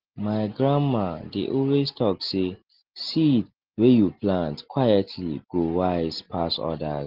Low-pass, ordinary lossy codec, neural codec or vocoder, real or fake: 5.4 kHz; Opus, 16 kbps; none; real